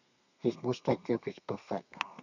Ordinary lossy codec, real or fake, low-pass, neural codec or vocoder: none; fake; 7.2 kHz; codec, 32 kHz, 1.9 kbps, SNAC